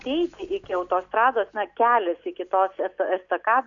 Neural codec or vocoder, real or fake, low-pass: none; real; 7.2 kHz